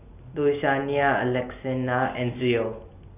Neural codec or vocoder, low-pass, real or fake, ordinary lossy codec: none; 3.6 kHz; real; none